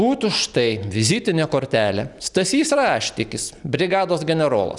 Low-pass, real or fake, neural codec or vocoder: 10.8 kHz; real; none